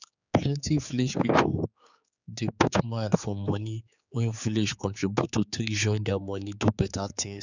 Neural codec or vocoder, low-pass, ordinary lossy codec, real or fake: codec, 16 kHz, 4 kbps, X-Codec, HuBERT features, trained on general audio; 7.2 kHz; none; fake